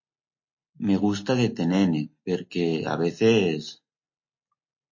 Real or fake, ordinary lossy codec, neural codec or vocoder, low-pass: real; MP3, 32 kbps; none; 7.2 kHz